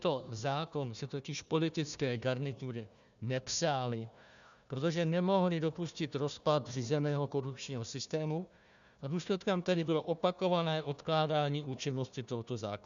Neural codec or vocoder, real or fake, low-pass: codec, 16 kHz, 1 kbps, FunCodec, trained on Chinese and English, 50 frames a second; fake; 7.2 kHz